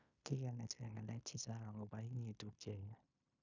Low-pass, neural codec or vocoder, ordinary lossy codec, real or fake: 7.2 kHz; codec, 16 kHz in and 24 kHz out, 0.9 kbps, LongCat-Audio-Codec, fine tuned four codebook decoder; none; fake